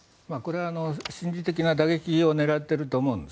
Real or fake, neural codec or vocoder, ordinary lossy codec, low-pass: real; none; none; none